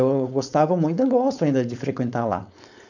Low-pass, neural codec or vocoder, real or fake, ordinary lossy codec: 7.2 kHz; codec, 16 kHz, 4.8 kbps, FACodec; fake; none